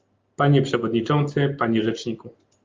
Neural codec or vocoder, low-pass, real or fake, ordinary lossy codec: none; 7.2 kHz; real; Opus, 24 kbps